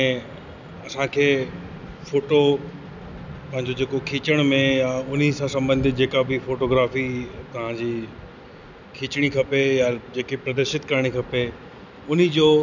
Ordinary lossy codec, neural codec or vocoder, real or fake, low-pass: none; none; real; 7.2 kHz